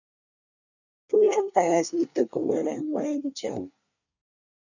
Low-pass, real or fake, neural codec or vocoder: 7.2 kHz; fake; codec, 24 kHz, 1 kbps, SNAC